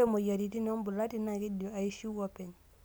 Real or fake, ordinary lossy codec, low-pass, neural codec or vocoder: real; none; none; none